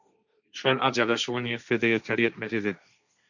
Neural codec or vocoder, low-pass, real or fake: codec, 16 kHz, 1.1 kbps, Voila-Tokenizer; 7.2 kHz; fake